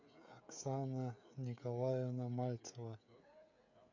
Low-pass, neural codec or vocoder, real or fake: 7.2 kHz; codec, 16 kHz, 16 kbps, FreqCodec, smaller model; fake